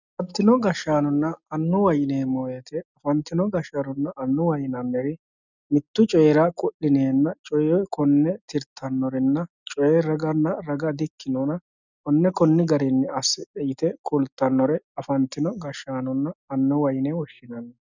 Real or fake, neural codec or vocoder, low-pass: real; none; 7.2 kHz